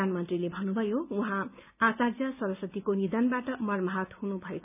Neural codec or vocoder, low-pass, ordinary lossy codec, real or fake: none; 3.6 kHz; none; real